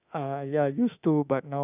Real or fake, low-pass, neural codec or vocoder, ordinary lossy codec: fake; 3.6 kHz; autoencoder, 48 kHz, 32 numbers a frame, DAC-VAE, trained on Japanese speech; none